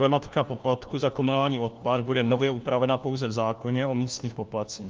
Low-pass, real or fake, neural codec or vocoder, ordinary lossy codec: 7.2 kHz; fake; codec, 16 kHz, 1 kbps, FunCodec, trained on LibriTTS, 50 frames a second; Opus, 24 kbps